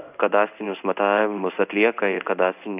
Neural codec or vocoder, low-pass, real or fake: codec, 16 kHz in and 24 kHz out, 1 kbps, XY-Tokenizer; 3.6 kHz; fake